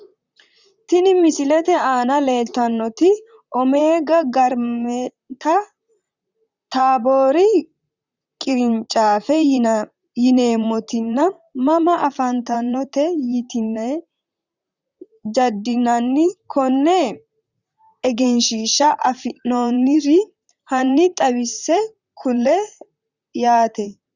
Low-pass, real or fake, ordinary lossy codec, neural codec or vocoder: 7.2 kHz; fake; Opus, 64 kbps; vocoder, 44.1 kHz, 128 mel bands, Pupu-Vocoder